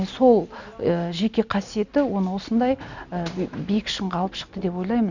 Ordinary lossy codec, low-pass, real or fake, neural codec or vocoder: none; 7.2 kHz; real; none